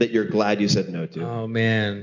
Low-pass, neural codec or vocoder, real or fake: 7.2 kHz; none; real